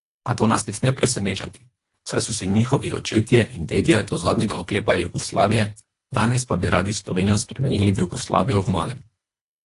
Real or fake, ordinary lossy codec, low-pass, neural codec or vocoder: fake; AAC, 48 kbps; 10.8 kHz; codec, 24 kHz, 1.5 kbps, HILCodec